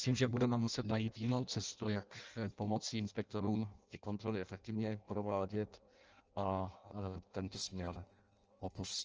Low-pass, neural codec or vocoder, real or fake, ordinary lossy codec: 7.2 kHz; codec, 16 kHz in and 24 kHz out, 0.6 kbps, FireRedTTS-2 codec; fake; Opus, 24 kbps